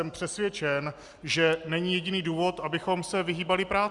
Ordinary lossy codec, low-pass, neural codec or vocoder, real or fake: Opus, 64 kbps; 10.8 kHz; none; real